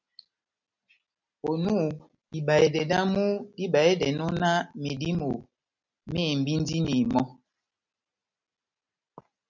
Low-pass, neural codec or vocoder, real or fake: 7.2 kHz; none; real